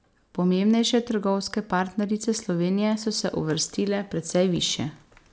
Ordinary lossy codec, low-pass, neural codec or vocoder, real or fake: none; none; none; real